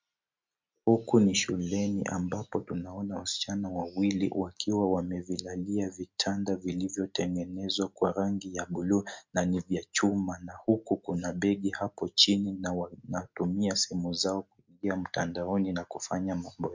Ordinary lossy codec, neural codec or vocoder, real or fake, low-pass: MP3, 64 kbps; none; real; 7.2 kHz